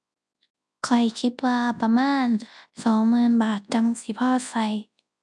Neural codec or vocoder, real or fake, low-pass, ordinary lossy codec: codec, 24 kHz, 0.9 kbps, WavTokenizer, large speech release; fake; 10.8 kHz; none